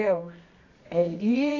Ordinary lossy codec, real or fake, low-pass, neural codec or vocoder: none; fake; 7.2 kHz; codec, 24 kHz, 0.9 kbps, WavTokenizer, medium music audio release